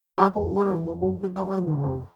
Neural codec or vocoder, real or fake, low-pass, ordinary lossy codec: codec, 44.1 kHz, 0.9 kbps, DAC; fake; 19.8 kHz; none